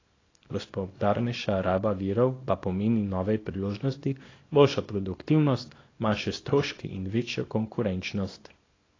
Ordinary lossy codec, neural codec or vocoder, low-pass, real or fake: AAC, 32 kbps; codec, 24 kHz, 0.9 kbps, WavTokenizer, small release; 7.2 kHz; fake